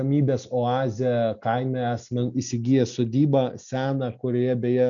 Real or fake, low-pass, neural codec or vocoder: real; 7.2 kHz; none